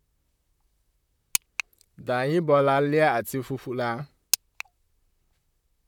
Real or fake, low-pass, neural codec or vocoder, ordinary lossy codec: fake; 19.8 kHz; vocoder, 44.1 kHz, 128 mel bands, Pupu-Vocoder; none